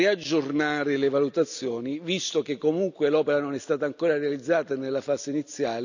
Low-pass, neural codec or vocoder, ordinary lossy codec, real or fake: 7.2 kHz; none; none; real